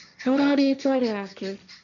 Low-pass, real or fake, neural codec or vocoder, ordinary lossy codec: 7.2 kHz; fake; codec, 16 kHz, 1.1 kbps, Voila-Tokenizer; none